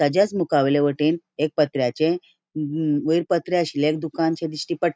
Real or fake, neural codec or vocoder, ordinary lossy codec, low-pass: real; none; none; none